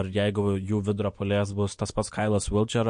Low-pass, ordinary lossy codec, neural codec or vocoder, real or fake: 9.9 kHz; MP3, 48 kbps; none; real